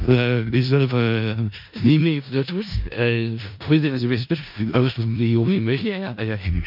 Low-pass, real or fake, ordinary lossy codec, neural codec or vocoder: 5.4 kHz; fake; MP3, 48 kbps; codec, 16 kHz in and 24 kHz out, 0.4 kbps, LongCat-Audio-Codec, four codebook decoder